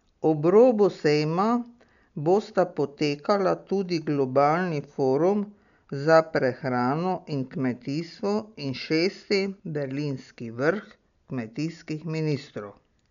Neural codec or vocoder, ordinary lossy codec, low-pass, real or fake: none; none; 7.2 kHz; real